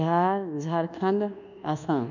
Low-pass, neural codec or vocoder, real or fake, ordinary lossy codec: 7.2 kHz; codec, 24 kHz, 1.2 kbps, DualCodec; fake; none